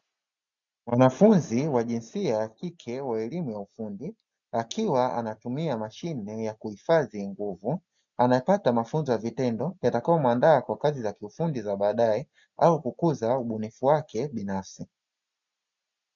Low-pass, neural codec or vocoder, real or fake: 7.2 kHz; none; real